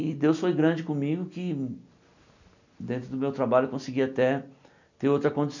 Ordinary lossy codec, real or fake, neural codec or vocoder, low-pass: none; real; none; 7.2 kHz